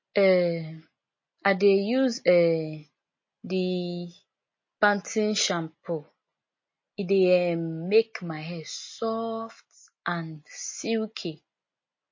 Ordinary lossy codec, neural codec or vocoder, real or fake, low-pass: MP3, 32 kbps; none; real; 7.2 kHz